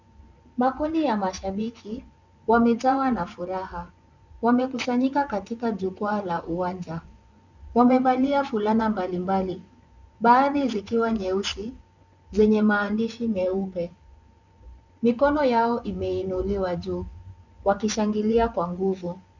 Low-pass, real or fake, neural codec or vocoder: 7.2 kHz; fake; vocoder, 24 kHz, 100 mel bands, Vocos